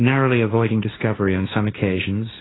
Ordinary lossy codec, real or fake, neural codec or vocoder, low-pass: AAC, 16 kbps; fake; codec, 16 kHz, 1.1 kbps, Voila-Tokenizer; 7.2 kHz